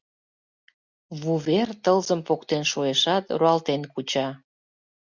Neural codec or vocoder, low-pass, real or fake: none; 7.2 kHz; real